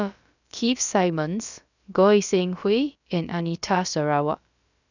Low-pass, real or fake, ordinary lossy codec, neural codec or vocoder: 7.2 kHz; fake; none; codec, 16 kHz, about 1 kbps, DyCAST, with the encoder's durations